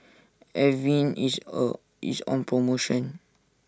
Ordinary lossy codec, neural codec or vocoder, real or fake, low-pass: none; none; real; none